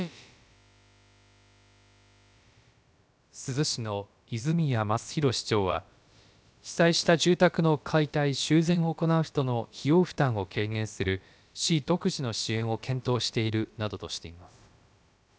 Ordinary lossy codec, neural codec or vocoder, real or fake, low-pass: none; codec, 16 kHz, about 1 kbps, DyCAST, with the encoder's durations; fake; none